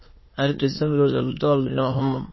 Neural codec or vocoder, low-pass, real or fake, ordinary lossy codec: autoencoder, 22.05 kHz, a latent of 192 numbers a frame, VITS, trained on many speakers; 7.2 kHz; fake; MP3, 24 kbps